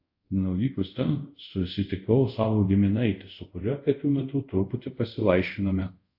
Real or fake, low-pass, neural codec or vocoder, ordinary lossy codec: fake; 5.4 kHz; codec, 24 kHz, 0.5 kbps, DualCodec; AAC, 48 kbps